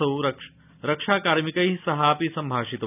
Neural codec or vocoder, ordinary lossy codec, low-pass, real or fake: none; none; 3.6 kHz; real